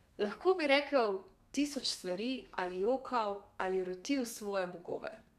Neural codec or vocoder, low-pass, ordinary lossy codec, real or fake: codec, 32 kHz, 1.9 kbps, SNAC; 14.4 kHz; none; fake